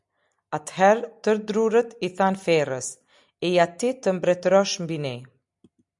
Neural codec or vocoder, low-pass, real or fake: none; 10.8 kHz; real